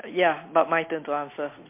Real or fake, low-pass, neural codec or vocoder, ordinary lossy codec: real; 3.6 kHz; none; MP3, 24 kbps